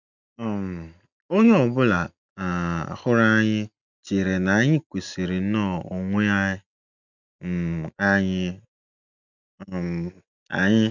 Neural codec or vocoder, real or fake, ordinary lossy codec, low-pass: none; real; none; 7.2 kHz